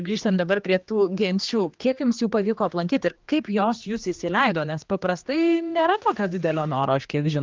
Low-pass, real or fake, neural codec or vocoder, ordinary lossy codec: 7.2 kHz; fake; codec, 16 kHz, 2 kbps, X-Codec, HuBERT features, trained on general audio; Opus, 32 kbps